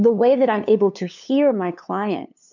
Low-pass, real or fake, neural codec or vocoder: 7.2 kHz; fake; codec, 16 kHz, 2 kbps, FunCodec, trained on LibriTTS, 25 frames a second